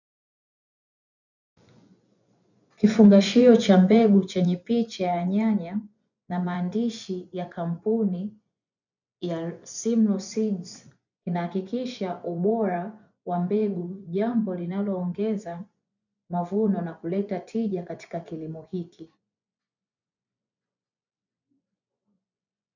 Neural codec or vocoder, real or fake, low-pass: vocoder, 24 kHz, 100 mel bands, Vocos; fake; 7.2 kHz